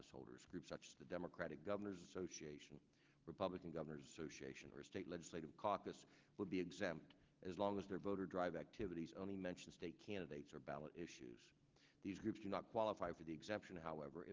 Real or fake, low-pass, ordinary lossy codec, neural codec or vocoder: real; 7.2 kHz; Opus, 16 kbps; none